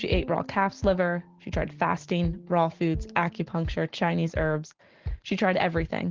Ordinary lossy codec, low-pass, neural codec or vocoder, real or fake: Opus, 16 kbps; 7.2 kHz; none; real